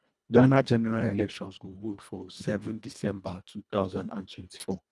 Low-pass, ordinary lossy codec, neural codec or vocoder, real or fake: none; none; codec, 24 kHz, 1.5 kbps, HILCodec; fake